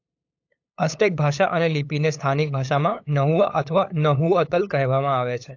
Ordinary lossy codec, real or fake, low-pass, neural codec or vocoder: AAC, 48 kbps; fake; 7.2 kHz; codec, 16 kHz, 8 kbps, FunCodec, trained on LibriTTS, 25 frames a second